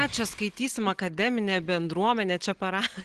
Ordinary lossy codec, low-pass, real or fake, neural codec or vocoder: Opus, 16 kbps; 10.8 kHz; real; none